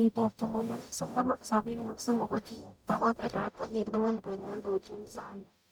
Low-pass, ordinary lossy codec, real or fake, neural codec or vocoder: none; none; fake; codec, 44.1 kHz, 0.9 kbps, DAC